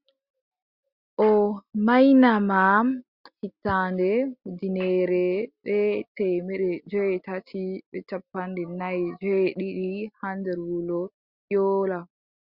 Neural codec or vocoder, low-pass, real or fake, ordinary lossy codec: none; 5.4 kHz; real; Opus, 64 kbps